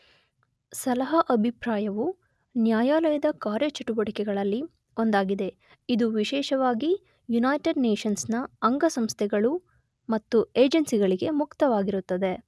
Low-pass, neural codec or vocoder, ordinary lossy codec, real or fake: none; none; none; real